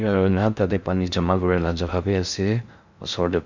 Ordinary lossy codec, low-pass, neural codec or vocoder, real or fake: Opus, 64 kbps; 7.2 kHz; codec, 16 kHz in and 24 kHz out, 0.6 kbps, FocalCodec, streaming, 4096 codes; fake